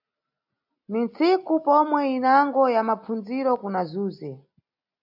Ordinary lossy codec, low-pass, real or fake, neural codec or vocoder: AAC, 48 kbps; 5.4 kHz; real; none